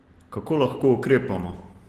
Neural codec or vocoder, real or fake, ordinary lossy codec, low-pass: none; real; Opus, 16 kbps; 14.4 kHz